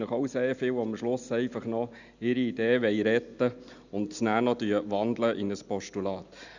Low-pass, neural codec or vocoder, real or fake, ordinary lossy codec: 7.2 kHz; none; real; none